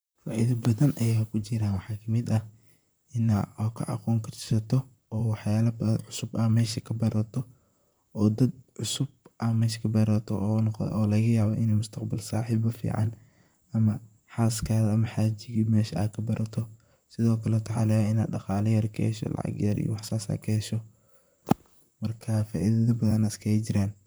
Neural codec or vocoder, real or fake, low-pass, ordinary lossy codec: vocoder, 44.1 kHz, 128 mel bands, Pupu-Vocoder; fake; none; none